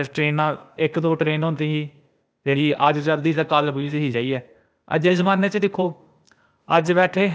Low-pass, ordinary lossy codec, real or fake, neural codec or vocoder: none; none; fake; codec, 16 kHz, 0.8 kbps, ZipCodec